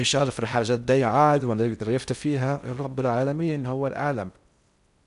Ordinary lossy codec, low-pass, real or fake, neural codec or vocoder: none; 10.8 kHz; fake; codec, 16 kHz in and 24 kHz out, 0.6 kbps, FocalCodec, streaming, 4096 codes